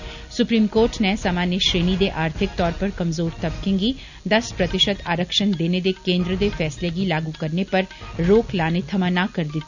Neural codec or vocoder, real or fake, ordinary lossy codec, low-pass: none; real; none; 7.2 kHz